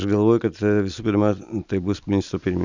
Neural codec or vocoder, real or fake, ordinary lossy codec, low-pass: none; real; Opus, 64 kbps; 7.2 kHz